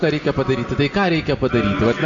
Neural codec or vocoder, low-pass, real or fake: none; 7.2 kHz; real